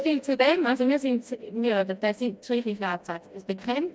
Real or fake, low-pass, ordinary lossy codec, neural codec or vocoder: fake; none; none; codec, 16 kHz, 1 kbps, FreqCodec, smaller model